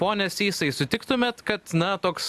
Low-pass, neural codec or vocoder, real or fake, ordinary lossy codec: 14.4 kHz; none; real; AAC, 96 kbps